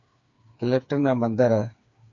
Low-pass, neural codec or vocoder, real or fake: 7.2 kHz; codec, 16 kHz, 4 kbps, FreqCodec, smaller model; fake